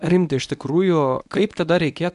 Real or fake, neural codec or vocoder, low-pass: fake; codec, 24 kHz, 0.9 kbps, WavTokenizer, medium speech release version 1; 10.8 kHz